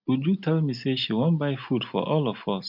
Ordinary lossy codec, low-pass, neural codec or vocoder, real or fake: none; 5.4 kHz; none; real